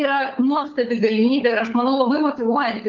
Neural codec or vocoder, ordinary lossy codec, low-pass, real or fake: codec, 16 kHz, 4 kbps, FunCodec, trained on LibriTTS, 50 frames a second; Opus, 32 kbps; 7.2 kHz; fake